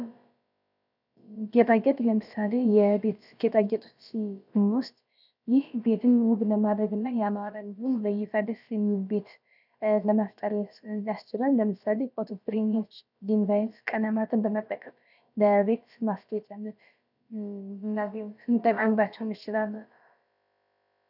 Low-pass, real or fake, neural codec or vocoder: 5.4 kHz; fake; codec, 16 kHz, about 1 kbps, DyCAST, with the encoder's durations